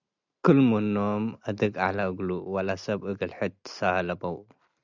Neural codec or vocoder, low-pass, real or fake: none; 7.2 kHz; real